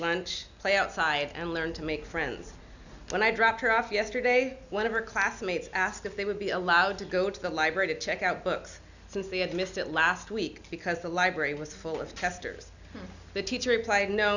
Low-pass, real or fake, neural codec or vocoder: 7.2 kHz; real; none